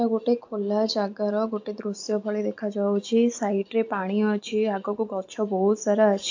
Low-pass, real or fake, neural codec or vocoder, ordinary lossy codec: 7.2 kHz; real; none; AAC, 48 kbps